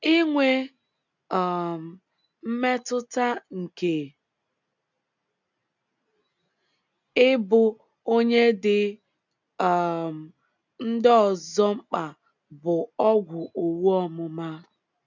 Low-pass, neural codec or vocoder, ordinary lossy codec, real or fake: 7.2 kHz; none; none; real